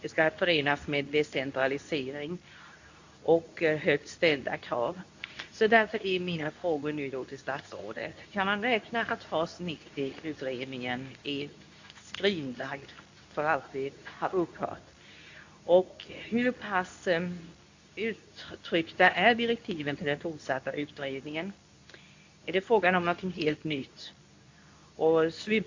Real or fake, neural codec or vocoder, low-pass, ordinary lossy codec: fake; codec, 24 kHz, 0.9 kbps, WavTokenizer, medium speech release version 2; 7.2 kHz; AAC, 48 kbps